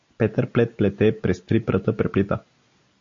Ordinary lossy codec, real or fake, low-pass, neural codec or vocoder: MP3, 48 kbps; real; 7.2 kHz; none